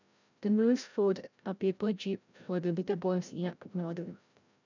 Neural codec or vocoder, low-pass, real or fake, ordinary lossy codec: codec, 16 kHz, 0.5 kbps, FreqCodec, larger model; 7.2 kHz; fake; none